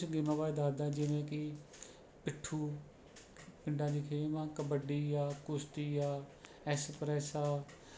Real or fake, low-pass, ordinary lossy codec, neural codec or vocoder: real; none; none; none